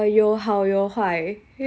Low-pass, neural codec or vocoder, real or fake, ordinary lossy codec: none; none; real; none